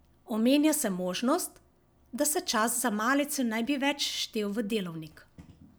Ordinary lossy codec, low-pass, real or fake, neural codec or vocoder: none; none; real; none